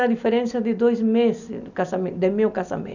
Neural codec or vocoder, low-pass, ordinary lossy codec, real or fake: none; 7.2 kHz; none; real